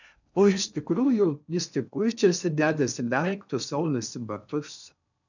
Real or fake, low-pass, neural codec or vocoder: fake; 7.2 kHz; codec, 16 kHz in and 24 kHz out, 0.6 kbps, FocalCodec, streaming, 4096 codes